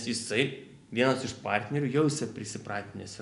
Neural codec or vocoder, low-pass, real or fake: none; 10.8 kHz; real